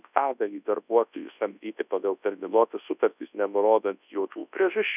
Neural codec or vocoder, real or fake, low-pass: codec, 24 kHz, 0.9 kbps, WavTokenizer, large speech release; fake; 3.6 kHz